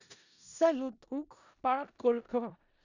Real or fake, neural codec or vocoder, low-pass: fake; codec, 16 kHz in and 24 kHz out, 0.4 kbps, LongCat-Audio-Codec, four codebook decoder; 7.2 kHz